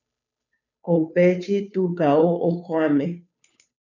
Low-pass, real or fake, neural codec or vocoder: 7.2 kHz; fake; codec, 16 kHz, 2 kbps, FunCodec, trained on Chinese and English, 25 frames a second